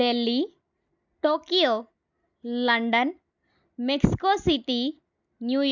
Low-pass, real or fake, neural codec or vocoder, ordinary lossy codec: 7.2 kHz; real; none; none